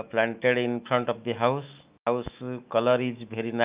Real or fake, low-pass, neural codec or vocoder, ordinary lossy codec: fake; 3.6 kHz; autoencoder, 48 kHz, 128 numbers a frame, DAC-VAE, trained on Japanese speech; Opus, 24 kbps